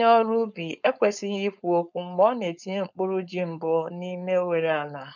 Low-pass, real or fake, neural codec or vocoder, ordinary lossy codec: 7.2 kHz; fake; codec, 16 kHz, 4.8 kbps, FACodec; none